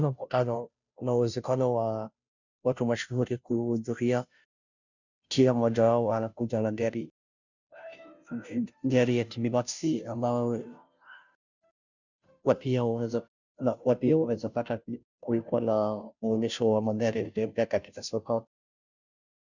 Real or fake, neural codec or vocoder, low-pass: fake; codec, 16 kHz, 0.5 kbps, FunCodec, trained on Chinese and English, 25 frames a second; 7.2 kHz